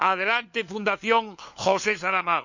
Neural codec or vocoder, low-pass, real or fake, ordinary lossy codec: codec, 16 kHz, 4 kbps, FunCodec, trained on LibriTTS, 50 frames a second; 7.2 kHz; fake; none